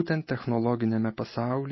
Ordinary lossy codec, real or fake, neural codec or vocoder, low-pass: MP3, 24 kbps; real; none; 7.2 kHz